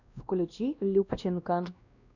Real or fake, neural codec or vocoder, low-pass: fake; codec, 16 kHz, 1 kbps, X-Codec, WavLM features, trained on Multilingual LibriSpeech; 7.2 kHz